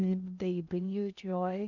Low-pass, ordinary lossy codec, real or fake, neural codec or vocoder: 7.2 kHz; none; fake; codec, 16 kHz in and 24 kHz out, 0.6 kbps, FocalCodec, streaming, 2048 codes